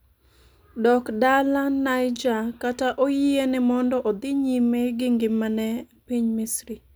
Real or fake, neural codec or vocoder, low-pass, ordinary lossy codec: real; none; none; none